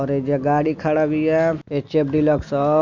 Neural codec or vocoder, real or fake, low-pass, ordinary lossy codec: none; real; 7.2 kHz; none